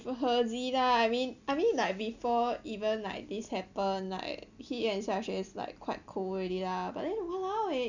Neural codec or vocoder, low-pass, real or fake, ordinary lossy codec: none; 7.2 kHz; real; none